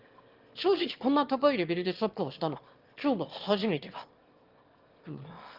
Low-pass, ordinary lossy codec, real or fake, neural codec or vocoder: 5.4 kHz; Opus, 32 kbps; fake; autoencoder, 22.05 kHz, a latent of 192 numbers a frame, VITS, trained on one speaker